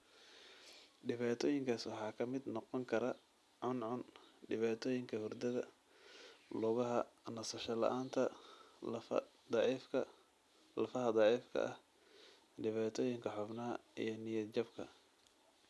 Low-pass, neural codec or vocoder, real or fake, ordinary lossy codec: none; none; real; none